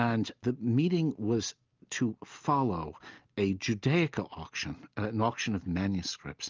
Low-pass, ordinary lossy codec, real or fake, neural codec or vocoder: 7.2 kHz; Opus, 24 kbps; real; none